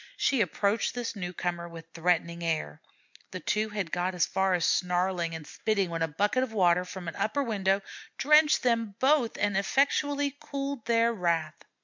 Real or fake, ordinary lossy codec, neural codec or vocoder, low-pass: real; MP3, 64 kbps; none; 7.2 kHz